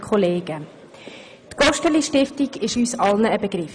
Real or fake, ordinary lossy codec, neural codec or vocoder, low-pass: real; none; none; 9.9 kHz